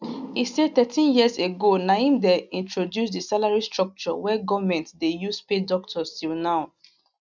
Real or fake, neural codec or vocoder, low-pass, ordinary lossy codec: real; none; 7.2 kHz; none